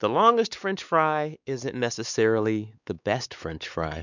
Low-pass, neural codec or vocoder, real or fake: 7.2 kHz; codec, 16 kHz, 4 kbps, X-Codec, WavLM features, trained on Multilingual LibriSpeech; fake